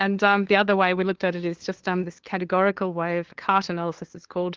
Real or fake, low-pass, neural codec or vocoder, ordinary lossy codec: fake; 7.2 kHz; codec, 16 kHz, 2 kbps, FunCodec, trained on LibriTTS, 25 frames a second; Opus, 16 kbps